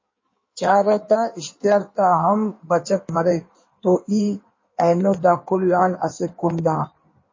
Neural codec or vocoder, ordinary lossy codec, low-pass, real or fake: codec, 16 kHz in and 24 kHz out, 1.1 kbps, FireRedTTS-2 codec; MP3, 32 kbps; 7.2 kHz; fake